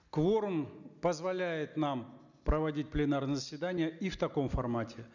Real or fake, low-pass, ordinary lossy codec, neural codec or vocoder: real; 7.2 kHz; none; none